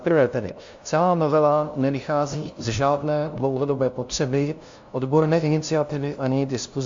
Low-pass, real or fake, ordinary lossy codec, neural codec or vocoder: 7.2 kHz; fake; MP3, 48 kbps; codec, 16 kHz, 0.5 kbps, FunCodec, trained on LibriTTS, 25 frames a second